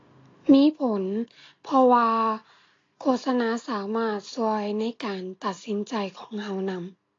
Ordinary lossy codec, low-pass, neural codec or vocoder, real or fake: AAC, 32 kbps; 7.2 kHz; none; real